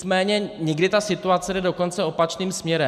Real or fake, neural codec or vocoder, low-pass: real; none; 14.4 kHz